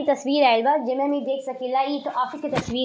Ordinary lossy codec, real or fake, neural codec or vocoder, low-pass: none; real; none; none